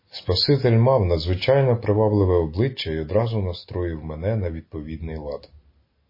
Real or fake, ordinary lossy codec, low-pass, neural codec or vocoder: real; MP3, 24 kbps; 5.4 kHz; none